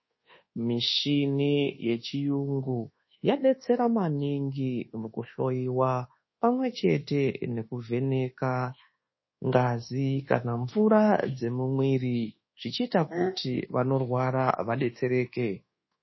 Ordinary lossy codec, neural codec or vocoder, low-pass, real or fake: MP3, 24 kbps; codec, 24 kHz, 1.2 kbps, DualCodec; 7.2 kHz; fake